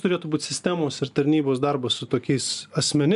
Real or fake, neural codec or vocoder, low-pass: real; none; 10.8 kHz